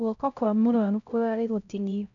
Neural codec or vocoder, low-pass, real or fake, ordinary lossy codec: codec, 16 kHz, 0.5 kbps, X-Codec, HuBERT features, trained on LibriSpeech; 7.2 kHz; fake; none